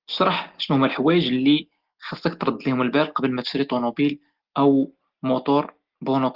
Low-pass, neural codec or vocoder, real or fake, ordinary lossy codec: 5.4 kHz; none; real; Opus, 16 kbps